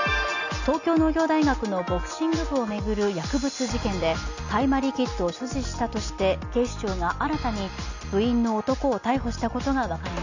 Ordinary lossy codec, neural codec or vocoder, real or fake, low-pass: none; none; real; 7.2 kHz